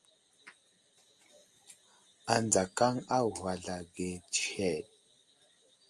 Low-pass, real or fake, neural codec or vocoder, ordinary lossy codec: 10.8 kHz; real; none; Opus, 32 kbps